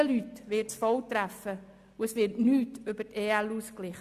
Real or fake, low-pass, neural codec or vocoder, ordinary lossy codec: real; 14.4 kHz; none; Opus, 64 kbps